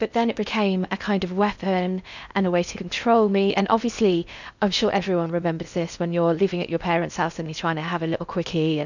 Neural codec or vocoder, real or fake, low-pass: codec, 16 kHz in and 24 kHz out, 0.6 kbps, FocalCodec, streaming, 2048 codes; fake; 7.2 kHz